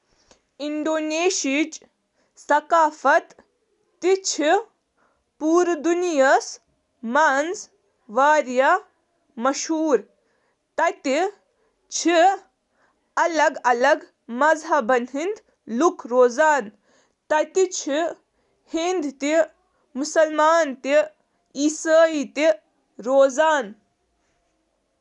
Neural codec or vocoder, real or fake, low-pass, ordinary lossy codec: none; real; 10.8 kHz; none